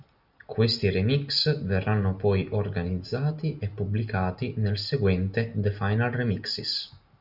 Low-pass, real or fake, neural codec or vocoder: 5.4 kHz; real; none